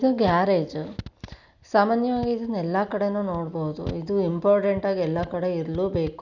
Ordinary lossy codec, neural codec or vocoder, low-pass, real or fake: none; none; 7.2 kHz; real